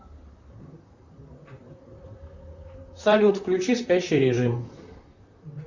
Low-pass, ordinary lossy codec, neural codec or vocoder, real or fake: 7.2 kHz; Opus, 64 kbps; vocoder, 44.1 kHz, 128 mel bands, Pupu-Vocoder; fake